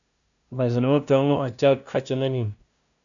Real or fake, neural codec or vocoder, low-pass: fake; codec, 16 kHz, 0.5 kbps, FunCodec, trained on LibriTTS, 25 frames a second; 7.2 kHz